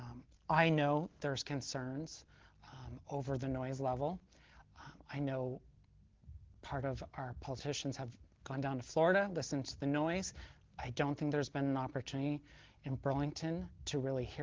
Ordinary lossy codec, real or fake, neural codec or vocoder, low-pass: Opus, 16 kbps; real; none; 7.2 kHz